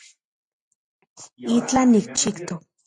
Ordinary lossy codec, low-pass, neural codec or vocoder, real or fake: MP3, 48 kbps; 10.8 kHz; none; real